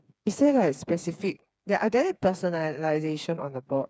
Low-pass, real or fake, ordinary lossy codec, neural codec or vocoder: none; fake; none; codec, 16 kHz, 4 kbps, FreqCodec, smaller model